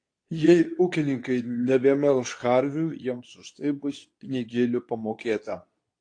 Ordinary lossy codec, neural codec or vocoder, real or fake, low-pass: AAC, 48 kbps; codec, 24 kHz, 0.9 kbps, WavTokenizer, medium speech release version 2; fake; 9.9 kHz